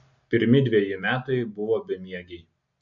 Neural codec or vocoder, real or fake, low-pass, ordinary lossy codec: none; real; 7.2 kHz; AAC, 64 kbps